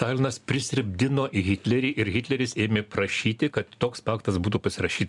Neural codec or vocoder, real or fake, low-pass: none; real; 10.8 kHz